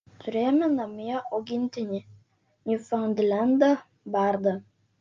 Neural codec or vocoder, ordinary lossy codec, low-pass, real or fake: none; Opus, 24 kbps; 7.2 kHz; real